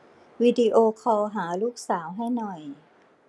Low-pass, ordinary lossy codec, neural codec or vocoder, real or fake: none; none; none; real